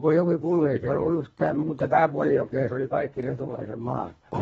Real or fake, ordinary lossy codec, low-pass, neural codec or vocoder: fake; AAC, 24 kbps; 10.8 kHz; codec, 24 kHz, 1.5 kbps, HILCodec